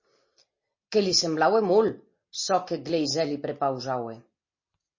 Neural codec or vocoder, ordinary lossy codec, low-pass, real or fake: none; MP3, 32 kbps; 7.2 kHz; real